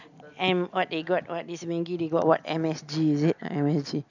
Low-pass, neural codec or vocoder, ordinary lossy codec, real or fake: 7.2 kHz; none; none; real